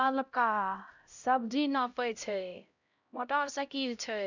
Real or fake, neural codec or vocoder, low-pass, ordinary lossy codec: fake; codec, 16 kHz, 0.5 kbps, X-Codec, HuBERT features, trained on LibriSpeech; 7.2 kHz; none